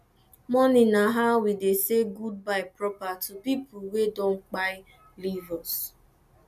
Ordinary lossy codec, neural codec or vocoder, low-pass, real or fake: none; none; 14.4 kHz; real